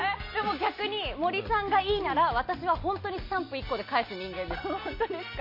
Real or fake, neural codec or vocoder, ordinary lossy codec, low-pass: real; none; MP3, 32 kbps; 5.4 kHz